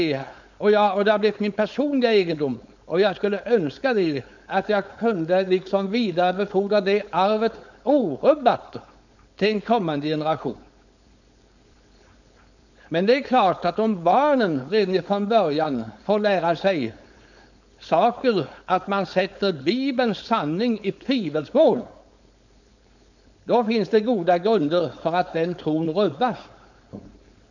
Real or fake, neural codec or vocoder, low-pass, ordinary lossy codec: fake; codec, 16 kHz, 4.8 kbps, FACodec; 7.2 kHz; none